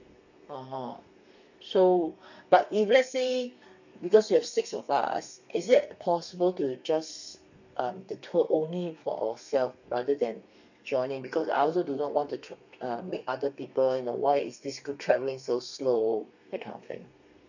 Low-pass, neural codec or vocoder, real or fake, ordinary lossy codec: 7.2 kHz; codec, 32 kHz, 1.9 kbps, SNAC; fake; none